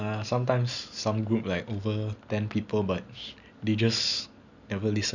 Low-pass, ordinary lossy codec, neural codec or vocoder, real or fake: 7.2 kHz; none; none; real